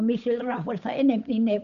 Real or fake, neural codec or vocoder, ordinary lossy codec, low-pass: fake; codec, 16 kHz, 8 kbps, FunCodec, trained on Chinese and English, 25 frames a second; none; 7.2 kHz